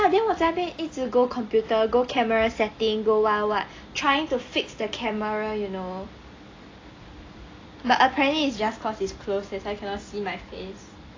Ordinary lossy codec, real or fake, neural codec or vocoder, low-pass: AAC, 32 kbps; real; none; 7.2 kHz